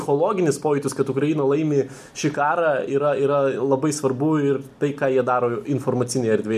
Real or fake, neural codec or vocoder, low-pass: real; none; 14.4 kHz